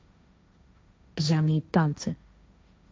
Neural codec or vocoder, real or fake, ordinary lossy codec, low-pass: codec, 16 kHz, 1.1 kbps, Voila-Tokenizer; fake; none; none